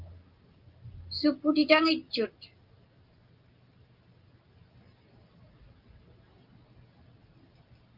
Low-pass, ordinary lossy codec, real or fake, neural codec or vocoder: 5.4 kHz; Opus, 24 kbps; real; none